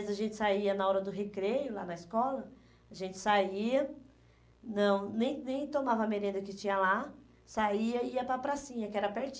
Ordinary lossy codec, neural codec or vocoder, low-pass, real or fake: none; none; none; real